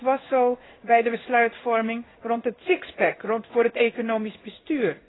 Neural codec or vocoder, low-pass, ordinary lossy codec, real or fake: codec, 16 kHz in and 24 kHz out, 1 kbps, XY-Tokenizer; 7.2 kHz; AAC, 16 kbps; fake